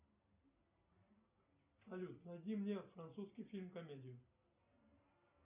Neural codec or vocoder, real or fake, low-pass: none; real; 3.6 kHz